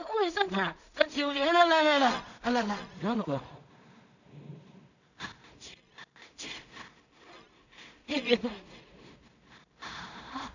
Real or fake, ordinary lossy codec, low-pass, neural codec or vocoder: fake; none; 7.2 kHz; codec, 16 kHz in and 24 kHz out, 0.4 kbps, LongCat-Audio-Codec, two codebook decoder